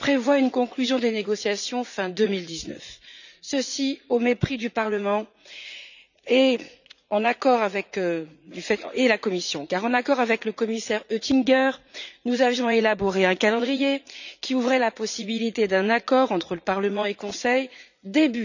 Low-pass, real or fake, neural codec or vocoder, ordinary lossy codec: 7.2 kHz; fake; vocoder, 44.1 kHz, 80 mel bands, Vocos; none